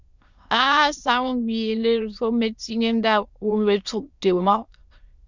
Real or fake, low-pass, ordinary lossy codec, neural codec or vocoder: fake; 7.2 kHz; Opus, 64 kbps; autoencoder, 22.05 kHz, a latent of 192 numbers a frame, VITS, trained on many speakers